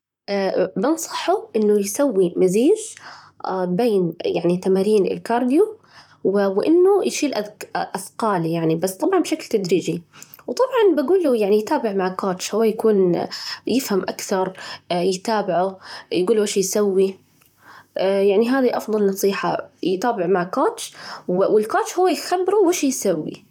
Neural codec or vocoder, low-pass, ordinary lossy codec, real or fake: vocoder, 44.1 kHz, 128 mel bands, Pupu-Vocoder; 19.8 kHz; none; fake